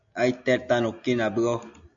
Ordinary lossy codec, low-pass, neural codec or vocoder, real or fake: AAC, 64 kbps; 7.2 kHz; none; real